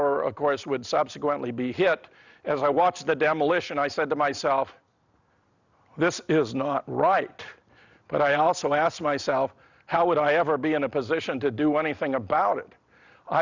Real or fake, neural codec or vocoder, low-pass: real; none; 7.2 kHz